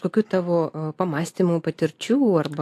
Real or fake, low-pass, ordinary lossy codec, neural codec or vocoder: real; 14.4 kHz; AAC, 64 kbps; none